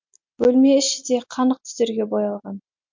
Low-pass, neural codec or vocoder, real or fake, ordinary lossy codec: 7.2 kHz; none; real; MP3, 48 kbps